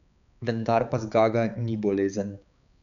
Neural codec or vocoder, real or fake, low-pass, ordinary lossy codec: codec, 16 kHz, 4 kbps, X-Codec, HuBERT features, trained on balanced general audio; fake; 7.2 kHz; none